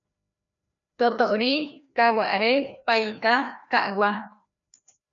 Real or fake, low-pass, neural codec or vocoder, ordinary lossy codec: fake; 7.2 kHz; codec, 16 kHz, 1 kbps, FreqCodec, larger model; MP3, 96 kbps